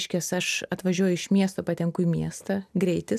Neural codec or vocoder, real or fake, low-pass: none; real; 14.4 kHz